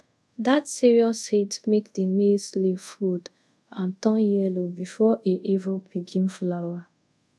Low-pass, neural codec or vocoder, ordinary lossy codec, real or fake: none; codec, 24 kHz, 0.5 kbps, DualCodec; none; fake